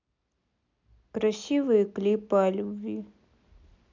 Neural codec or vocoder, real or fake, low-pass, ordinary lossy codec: none; real; 7.2 kHz; MP3, 64 kbps